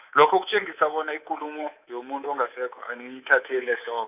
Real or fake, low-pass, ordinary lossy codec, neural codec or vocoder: fake; 3.6 kHz; none; codec, 24 kHz, 3.1 kbps, DualCodec